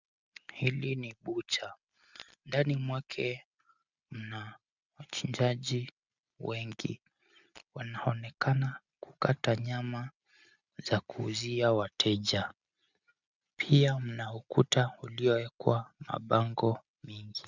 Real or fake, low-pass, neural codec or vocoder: real; 7.2 kHz; none